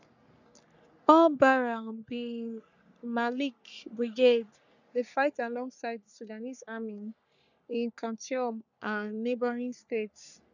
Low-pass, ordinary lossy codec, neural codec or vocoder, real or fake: 7.2 kHz; none; codec, 44.1 kHz, 3.4 kbps, Pupu-Codec; fake